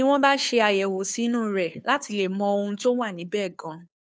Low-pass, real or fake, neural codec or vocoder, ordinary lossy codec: none; fake; codec, 16 kHz, 2 kbps, FunCodec, trained on Chinese and English, 25 frames a second; none